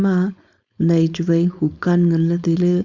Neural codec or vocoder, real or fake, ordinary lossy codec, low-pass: codec, 16 kHz, 4.8 kbps, FACodec; fake; Opus, 64 kbps; 7.2 kHz